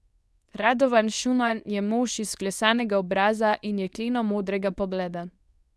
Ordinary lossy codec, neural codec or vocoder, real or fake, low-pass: none; codec, 24 kHz, 0.9 kbps, WavTokenizer, medium speech release version 2; fake; none